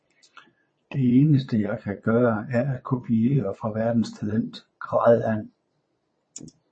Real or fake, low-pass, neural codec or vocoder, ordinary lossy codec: fake; 9.9 kHz; vocoder, 22.05 kHz, 80 mel bands, Vocos; MP3, 32 kbps